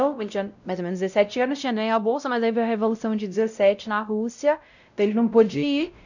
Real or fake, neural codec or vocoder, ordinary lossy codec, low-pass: fake; codec, 16 kHz, 0.5 kbps, X-Codec, WavLM features, trained on Multilingual LibriSpeech; none; 7.2 kHz